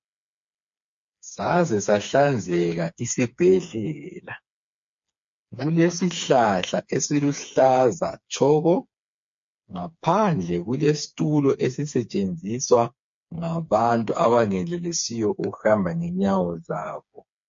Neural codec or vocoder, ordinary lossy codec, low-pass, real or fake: codec, 16 kHz, 4 kbps, FreqCodec, smaller model; MP3, 48 kbps; 7.2 kHz; fake